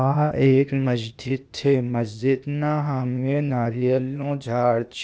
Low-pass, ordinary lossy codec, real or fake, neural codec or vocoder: none; none; fake; codec, 16 kHz, 0.8 kbps, ZipCodec